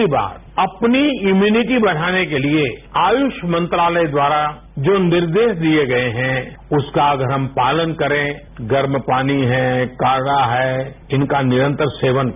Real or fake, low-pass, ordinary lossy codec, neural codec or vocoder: real; 3.6 kHz; none; none